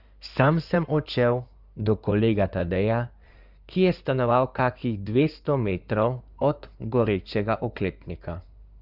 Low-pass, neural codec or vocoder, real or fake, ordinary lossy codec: 5.4 kHz; codec, 16 kHz in and 24 kHz out, 2.2 kbps, FireRedTTS-2 codec; fake; Opus, 64 kbps